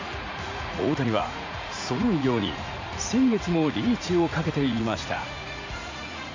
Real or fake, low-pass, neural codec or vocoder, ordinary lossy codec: fake; 7.2 kHz; vocoder, 44.1 kHz, 80 mel bands, Vocos; MP3, 64 kbps